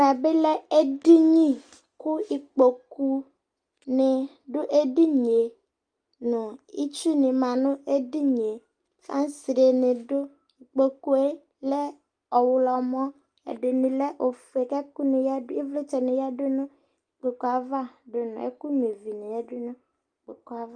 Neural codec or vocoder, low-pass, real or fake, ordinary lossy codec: none; 9.9 kHz; real; Opus, 32 kbps